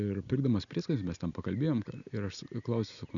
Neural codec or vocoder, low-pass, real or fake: none; 7.2 kHz; real